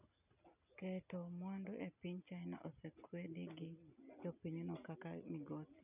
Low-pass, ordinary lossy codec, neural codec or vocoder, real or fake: 3.6 kHz; none; none; real